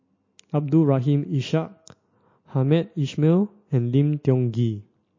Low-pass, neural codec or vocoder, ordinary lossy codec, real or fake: 7.2 kHz; none; MP3, 32 kbps; real